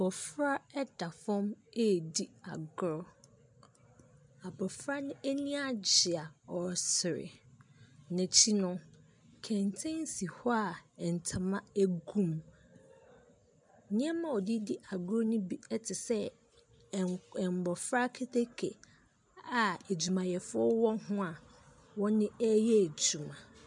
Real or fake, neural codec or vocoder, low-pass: real; none; 10.8 kHz